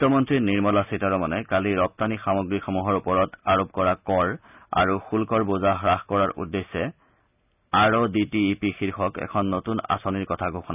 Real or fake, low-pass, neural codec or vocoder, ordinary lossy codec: real; 3.6 kHz; none; none